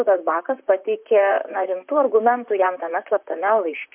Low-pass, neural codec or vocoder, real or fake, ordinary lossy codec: 3.6 kHz; vocoder, 44.1 kHz, 128 mel bands, Pupu-Vocoder; fake; MP3, 32 kbps